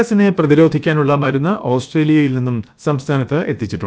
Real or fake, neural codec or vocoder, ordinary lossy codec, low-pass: fake; codec, 16 kHz, about 1 kbps, DyCAST, with the encoder's durations; none; none